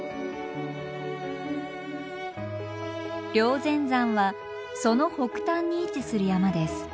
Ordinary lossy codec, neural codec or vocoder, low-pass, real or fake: none; none; none; real